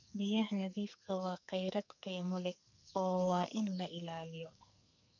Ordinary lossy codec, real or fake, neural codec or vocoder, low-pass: none; fake; codec, 44.1 kHz, 2.6 kbps, SNAC; 7.2 kHz